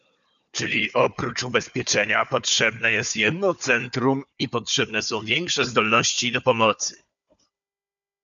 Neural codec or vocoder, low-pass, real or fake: codec, 16 kHz, 4 kbps, FunCodec, trained on Chinese and English, 50 frames a second; 7.2 kHz; fake